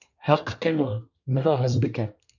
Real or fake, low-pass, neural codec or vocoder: fake; 7.2 kHz; codec, 24 kHz, 1 kbps, SNAC